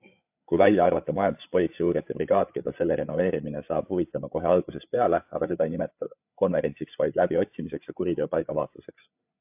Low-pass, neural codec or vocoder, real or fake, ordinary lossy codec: 3.6 kHz; codec, 16 kHz, 8 kbps, FreqCodec, larger model; fake; AAC, 32 kbps